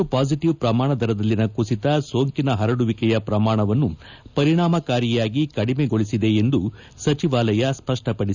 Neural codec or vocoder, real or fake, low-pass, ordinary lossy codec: none; real; 7.2 kHz; none